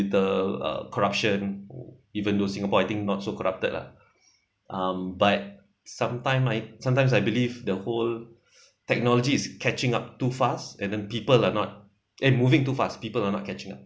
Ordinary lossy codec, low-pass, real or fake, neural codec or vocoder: none; none; real; none